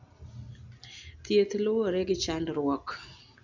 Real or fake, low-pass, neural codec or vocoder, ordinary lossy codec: real; 7.2 kHz; none; none